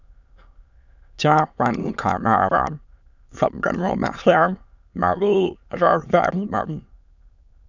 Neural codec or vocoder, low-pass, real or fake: autoencoder, 22.05 kHz, a latent of 192 numbers a frame, VITS, trained on many speakers; 7.2 kHz; fake